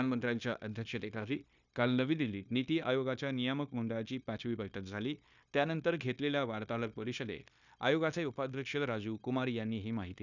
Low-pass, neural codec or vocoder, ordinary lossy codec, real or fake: 7.2 kHz; codec, 16 kHz, 0.9 kbps, LongCat-Audio-Codec; none; fake